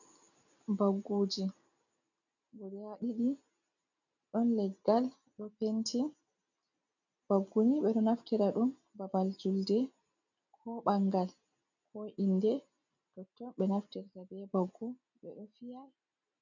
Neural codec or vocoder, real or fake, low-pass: none; real; 7.2 kHz